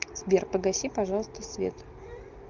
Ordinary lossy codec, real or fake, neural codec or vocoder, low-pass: Opus, 32 kbps; real; none; 7.2 kHz